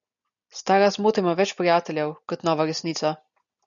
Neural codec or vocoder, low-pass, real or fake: none; 7.2 kHz; real